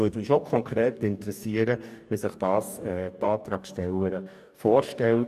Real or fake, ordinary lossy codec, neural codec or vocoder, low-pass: fake; none; codec, 44.1 kHz, 2.6 kbps, DAC; 14.4 kHz